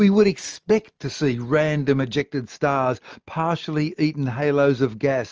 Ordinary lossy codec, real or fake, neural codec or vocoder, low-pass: Opus, 24 kbps; real; none; 7.2 kHz